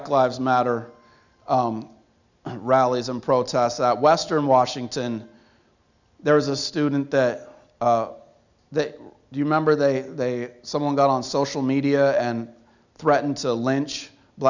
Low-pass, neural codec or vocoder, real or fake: 7.2 kHz; none; real